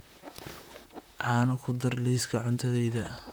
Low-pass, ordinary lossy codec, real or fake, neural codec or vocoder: none; none; fake; vocoder, 44.1 kHz, 128 mel bands, Pupu-Vocoder